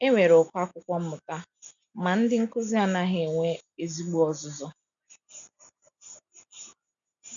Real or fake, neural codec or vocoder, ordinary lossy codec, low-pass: real; none; none; 7.2 kHz